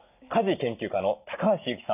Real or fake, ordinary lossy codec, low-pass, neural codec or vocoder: real; none; 3.6 kHz; none